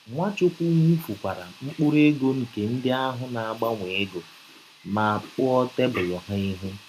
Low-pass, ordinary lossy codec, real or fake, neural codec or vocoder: 14.4 kHz; none; real; none